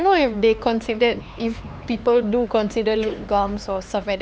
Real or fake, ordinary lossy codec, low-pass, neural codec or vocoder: fake; none; none; codec, 16 kHz, 4 kbps, X-Codec, HuBERT features, trained on LibriSpeech